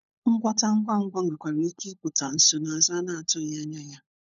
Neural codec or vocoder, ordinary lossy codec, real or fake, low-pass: codec, 16 kHz, 16 kbps, FunCodec, trained on LibriTTS, 50 frames a second; none; fake; 7.2 kHz